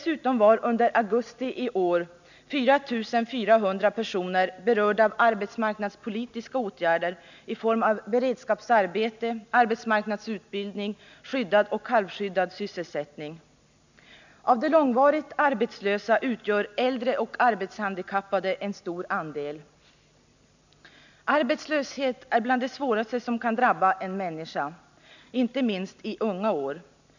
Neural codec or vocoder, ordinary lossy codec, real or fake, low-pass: none; none; real; 7.2 kHz